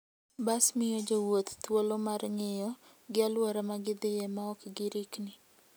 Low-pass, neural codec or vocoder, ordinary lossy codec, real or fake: none; none; none; real